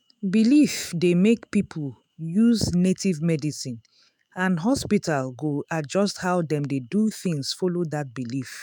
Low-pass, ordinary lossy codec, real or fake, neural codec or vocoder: 19.8 kHz; none; fake; autoencoder, 48 kHz, 128 numbers a frame, DAC-VAE, trained on Japanese speech